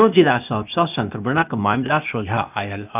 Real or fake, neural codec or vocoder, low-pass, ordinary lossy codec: fake; codec, 16 kHz, 0.8 kbps, ZipCodec; 3.6 kHz; none